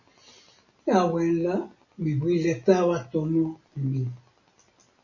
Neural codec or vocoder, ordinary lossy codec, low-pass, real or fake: none; MP3, 32 kbps; 7.2 kHz; real